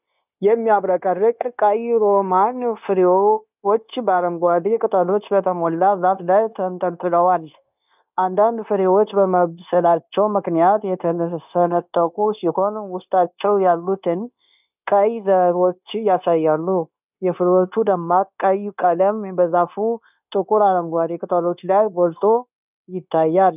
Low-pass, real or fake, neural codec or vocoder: 3.6 kHz; fake; codec, 16 kHz, 0.9 kbps, LongCat-Audio-Codec